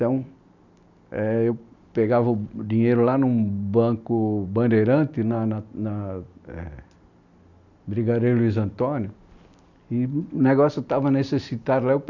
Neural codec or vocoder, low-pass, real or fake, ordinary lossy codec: none; 7.2 kHz; real; none